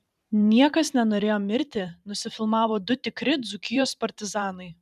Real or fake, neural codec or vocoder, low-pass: fake; vocoder, 44.1 kHz, 128 mel bands every 512 samples, BigVGAN v2; 14.4 kHz